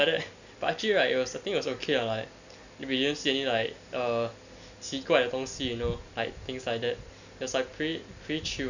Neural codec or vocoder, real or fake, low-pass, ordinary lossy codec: none; real; 7.2 kHz; none